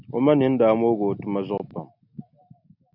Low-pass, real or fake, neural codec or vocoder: 5.4 kHz; real; none